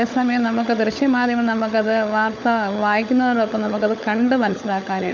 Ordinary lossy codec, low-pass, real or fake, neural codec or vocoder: none; none; fake; codec, 16 kHz, 16 kbps, FunCodec, trained on LibriTTS, 50 frames a second